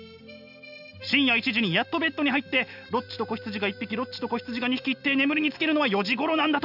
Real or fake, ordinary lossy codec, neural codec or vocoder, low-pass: real; none; none; 5.4 kHz